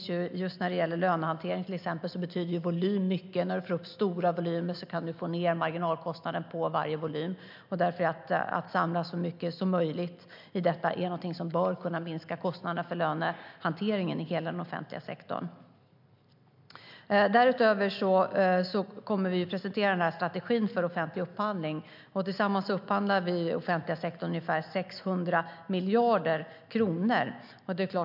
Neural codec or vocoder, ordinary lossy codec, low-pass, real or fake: none; MP3, 48 kbps; 5.4 kHz; real